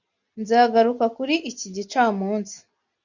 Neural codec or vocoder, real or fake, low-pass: none; real; 7.2 kHz